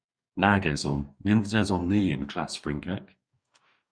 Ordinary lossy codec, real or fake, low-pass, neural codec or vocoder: Opus, 64 kbps; fake; 9.9 kHz; codec, 44.1 kHz, 2.6 kbps, DAC